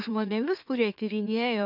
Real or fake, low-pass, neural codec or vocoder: fake; 5.4 kHz; autoencoder, 44.1 kHz, a latent of 192 numbers a frame, MeloTTS